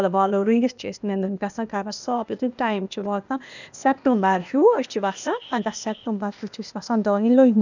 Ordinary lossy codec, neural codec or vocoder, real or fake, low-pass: none; codec, 16 kHz, 0.8 kbps, ZipCodec; fake; 7.2 kHz